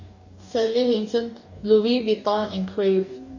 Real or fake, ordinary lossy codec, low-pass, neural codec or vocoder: fake; none; 7.2 kHz; codec, 44.1 kHz, 2.6 kbps, DAC